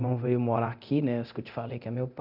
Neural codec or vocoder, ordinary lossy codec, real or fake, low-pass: codec, 24 kHz, 0.9 kbps, DualCodec; none; fake; 5.4 kHz